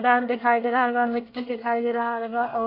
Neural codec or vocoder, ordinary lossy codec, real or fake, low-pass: codec, 24 kHz, 1 kbps, SNAC; AAC, 32 kbps; fake; 5.4 kHz